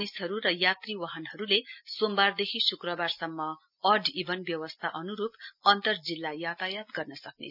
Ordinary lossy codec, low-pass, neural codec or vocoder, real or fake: none; 5.4 kHz; none; real